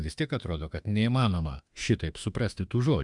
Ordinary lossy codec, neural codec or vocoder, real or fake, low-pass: Opus, 64 kbps; autoencoder, 48 kHz, 32 numbers a frame, DAC-VAE, trained on Japanese speech; fake; 10.8 kHz